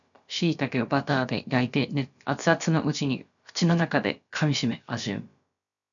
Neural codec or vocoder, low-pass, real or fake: codec, 16 kHz, about 1 kbps, DyCAST, with the encoder's durations; 7.2 kHz; fake